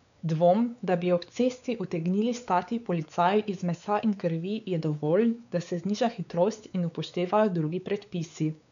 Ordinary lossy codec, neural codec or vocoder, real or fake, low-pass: none; codec, 16 kHz, 4 kbps, X-Codec, WavLM features, trained on Multilingual LibriSpeech; fake; 7.2 kHz